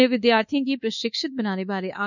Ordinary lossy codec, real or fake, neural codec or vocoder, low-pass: none; fake; codec, 24 kHz, 1.2 kbps, DualCodec; 7.2 kHz